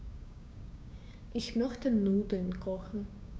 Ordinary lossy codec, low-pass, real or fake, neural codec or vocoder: none; none; fake; codec, 16 kHz, 6 kbps, DAC